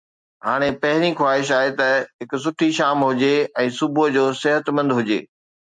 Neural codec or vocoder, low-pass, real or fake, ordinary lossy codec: none; 9.9 kHz; real; MP3, 64 kbps